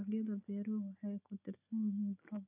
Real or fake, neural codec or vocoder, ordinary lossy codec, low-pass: real; none; none; 3.6 kHz